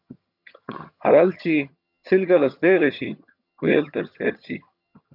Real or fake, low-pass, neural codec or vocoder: fake; 5.4 kHz; vocoder, 22.05 kHz, 80 mel bands, HiFi-GAN